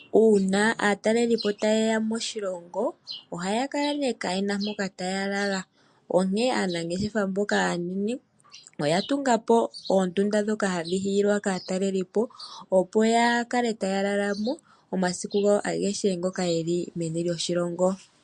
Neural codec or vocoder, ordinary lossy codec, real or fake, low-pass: none; MP3, 48 kbps; real; 10.8 kHz